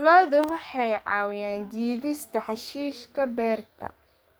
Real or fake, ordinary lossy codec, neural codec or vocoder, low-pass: fake; none; codec, 44.1 kHz, 2.6 kbps, SNAC; none